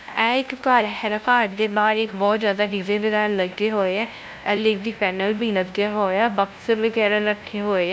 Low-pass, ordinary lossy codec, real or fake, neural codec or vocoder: none; none; fake; codec, 16 kHz, 0.5 kbps, FunCodec, trained on LibriTTS, 25 frames a second